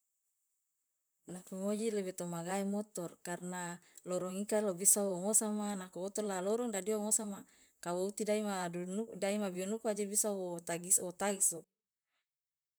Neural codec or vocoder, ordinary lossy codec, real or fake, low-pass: vocoder, 44.1 kHz, 128 mel bands, Pupu-Vocoder; none; fake; none